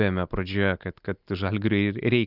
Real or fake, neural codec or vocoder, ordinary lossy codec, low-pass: real; none; Opus, 32 kbps; 5.4 kHz